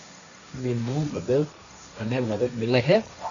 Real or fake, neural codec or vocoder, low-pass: fake; codec, 16 kHz, 1.1 kbps, Voila-Tokenizer; 7.2 kHz